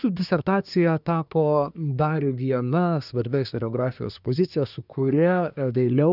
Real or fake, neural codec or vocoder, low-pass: fake; codec, 24 kHz, 1 kbps, SNAC; 5.4 kHz